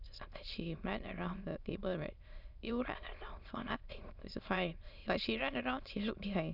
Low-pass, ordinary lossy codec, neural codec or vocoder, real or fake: 5.4 kHz; none; autoencoder, 22.05 kHz, a latent of 192 numbers a frame, VITS, trained on many speakers; fake